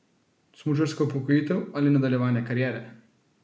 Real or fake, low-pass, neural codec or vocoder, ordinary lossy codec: real; none; none; none